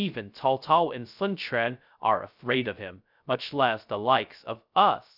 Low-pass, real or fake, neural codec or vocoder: 5.4 kHz; fake; codec, 16 kHz, 0.2 kbps, FocalCodec